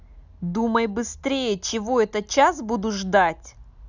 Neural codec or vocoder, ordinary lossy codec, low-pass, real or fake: none; none; 7.2 kHz; real